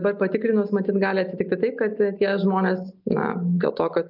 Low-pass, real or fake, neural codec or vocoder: 5.4 kHz; real; none